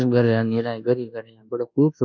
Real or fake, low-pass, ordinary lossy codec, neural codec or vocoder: fake; 7.2 kHz; none; codec, 24 kHz, 1.2 kbps, DualCodec